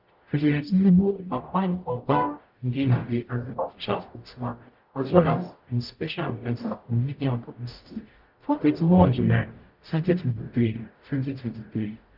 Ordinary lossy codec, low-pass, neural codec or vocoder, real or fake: Opus, 32 kbps; 5.4 kHz; codec, 44.1 kHz, 0.9 kbps, DAC; fake